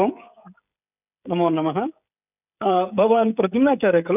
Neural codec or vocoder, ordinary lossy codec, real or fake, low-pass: codec, 16 kHz, 16 kbps, FreqCodec, smaller model; none; fake; 3.6 kHz